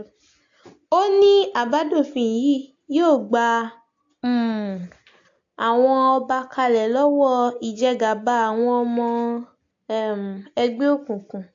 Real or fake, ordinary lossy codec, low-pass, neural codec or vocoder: real; AAC, 48 kbps; 7.2 kHz; none